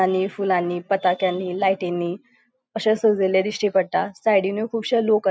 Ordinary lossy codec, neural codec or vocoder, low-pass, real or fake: none; none; none; real